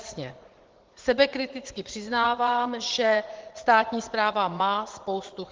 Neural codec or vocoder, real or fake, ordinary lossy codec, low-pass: vocoder, 44.1 kHz, 80 mel bands, Vocos; fake; Opus, 16 kbps; 7.2 kHz